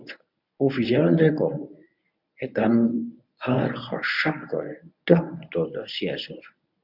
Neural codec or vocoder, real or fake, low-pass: codec, 24 kHz, 0.9 kbps, WavTokenizer, medium speech release version 1; fake; 5.4 kHz